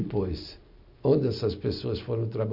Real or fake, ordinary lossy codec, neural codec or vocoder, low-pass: real; none; none; 5.4 kHz